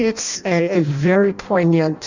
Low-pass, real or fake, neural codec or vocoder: 7.2 kHz; fake; codec, 16 kHz in and 24 kHz out, 0.6 kbps, FireRedTTS-2 codec